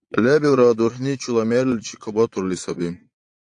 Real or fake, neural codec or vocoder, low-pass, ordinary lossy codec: fake; vocoder, 22.05 kHz, 80 mel bands, Vocos; 9.9 kHz; AAC, 64 kbps